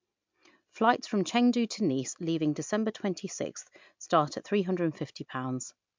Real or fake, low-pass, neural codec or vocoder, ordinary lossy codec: real; 7.2 kHz; none; MP3, 64 kbps